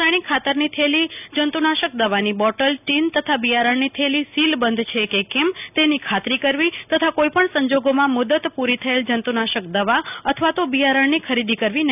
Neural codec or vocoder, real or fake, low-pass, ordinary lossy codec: none; real; 3.6 kHz; none